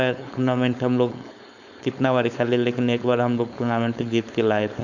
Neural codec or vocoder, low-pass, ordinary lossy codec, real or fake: codec, 16 kHz, 4.8 kbps, FACodec; 7.2 kHz; none; fake